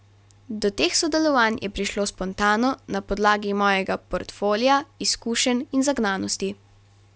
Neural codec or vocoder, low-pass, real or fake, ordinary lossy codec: none; none; real; none